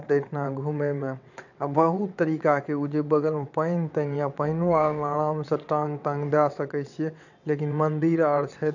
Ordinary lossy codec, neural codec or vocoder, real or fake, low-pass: none; vocoder, 22.05 kHz, 80 mel bands, WaveNeXt; fake; 7.2 kHz